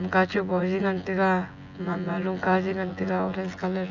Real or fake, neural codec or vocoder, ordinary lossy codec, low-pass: fake; vocoder, 24 kHz, 100 mel bands, Vocos; none; 7.2 kHz